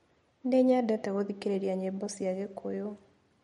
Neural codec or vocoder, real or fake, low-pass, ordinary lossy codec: none; real; 19.8 kHz; MP3, 48 kbps